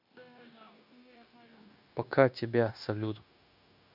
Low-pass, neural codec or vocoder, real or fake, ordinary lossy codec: 5.4 kHz; codec, 16 kHz, 0.9 kbps, LongCat-Audio-Codec; fake; none